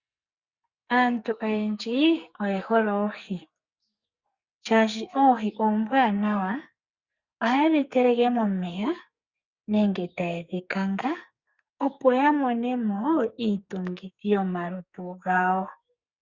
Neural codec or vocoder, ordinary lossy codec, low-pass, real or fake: codec, 44.1 kHz, 2.6 kbps, SNAC; Opus, 64 kbps; 7.2 kHz; fake